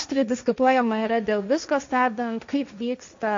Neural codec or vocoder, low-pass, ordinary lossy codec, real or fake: codec, 16 kHz, 1.1 kbps, Voila-Tokenizer; 7.2 kHz; MP3, 48 kbps; fake